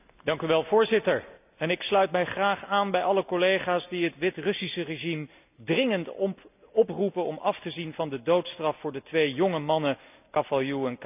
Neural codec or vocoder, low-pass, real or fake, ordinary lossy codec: none; 3.6 kHz; real; none